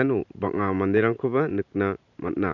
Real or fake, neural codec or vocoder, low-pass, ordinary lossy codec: fake; vocoder, 44.1 kHz, 128 mel bands every 512 samples, BigVGAN v2; 7.2 kHz; none